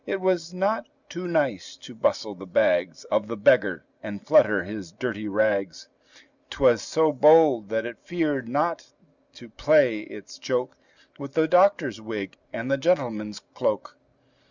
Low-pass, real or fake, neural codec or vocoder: 7.2 kHz; real; none